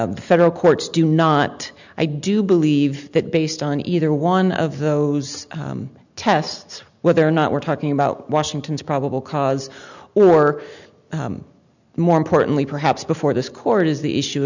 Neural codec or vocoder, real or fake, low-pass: none; real; 7.2 kHz